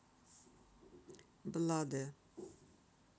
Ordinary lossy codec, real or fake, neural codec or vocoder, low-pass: none; real; none; none